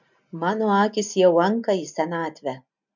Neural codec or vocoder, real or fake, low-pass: none; real; 7.2 kHz